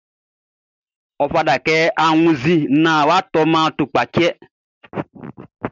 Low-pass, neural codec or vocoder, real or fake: 7.2 kHz; none; real